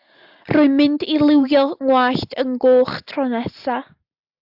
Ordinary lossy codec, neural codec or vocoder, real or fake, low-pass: AAC, 48 kbps; none; real; 5.4 kHz